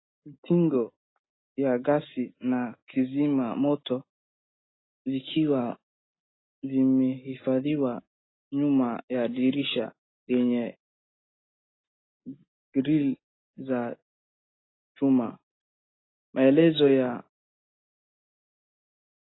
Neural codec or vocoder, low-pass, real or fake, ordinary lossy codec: none; 7.2 kHz; real; AAC, 16 kbps